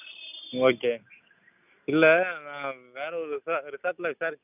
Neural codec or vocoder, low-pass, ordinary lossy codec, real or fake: none; 3.6 kHz; none; real